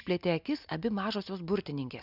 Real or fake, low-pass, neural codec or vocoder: real; 5.4 kHz; none